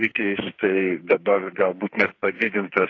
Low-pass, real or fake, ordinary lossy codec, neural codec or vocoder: 7.2 kHz; fake; AAC, 32 kbps; codec, 44.1 kHz, 2.6 kbps, SNAC